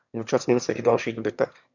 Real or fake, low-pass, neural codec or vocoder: fake; 7.2 kHz; autoencoder, 22.05 kHz, a latent of 192 numbers a frame, VITS, trained on one speaker